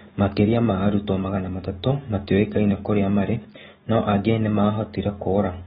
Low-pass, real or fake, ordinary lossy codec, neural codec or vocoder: 19.8 kHz; fake; AAC, 16 kbps; autoencoder, 48 kHz, 128 numbers a frame, DAC-VAE, trained on Japanese speech